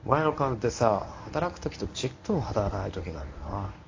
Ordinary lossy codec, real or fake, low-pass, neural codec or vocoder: AAC, 48 kbps; fake; 7.2 kHz; codec, 24 kHz, 0.9 kbps, WavTokenizer, medium speech release version 1